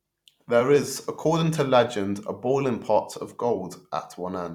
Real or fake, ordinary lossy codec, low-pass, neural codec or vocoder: fake; none; 19.8 kHz; vocoder, 44.1 kHz, 128 mel bands every 256 samples, BigVGAN v2